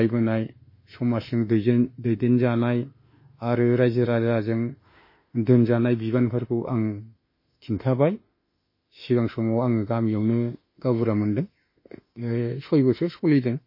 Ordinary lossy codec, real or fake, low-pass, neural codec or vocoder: MP3, 24 kbps; fake; 5.4 kHz; autoencoder, 48 kHz, 32 numbers a frame, DAC-VAE, trained on Japanese speech